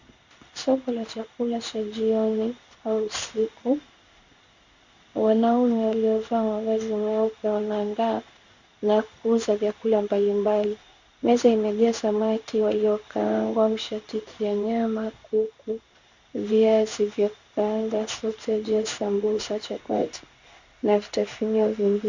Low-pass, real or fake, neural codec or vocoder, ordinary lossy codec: 7.2 kHz; fake; codec, 16 kHz in and 24 kHz out, 1 kbps, XY-Tokenizer; Opus, 64 kbps